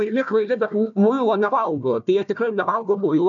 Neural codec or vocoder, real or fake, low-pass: codec, 16 kHz, 1 kbps, FunCodec, trained on Chinese and English, 50 frames a second; fake; 7.2 kHz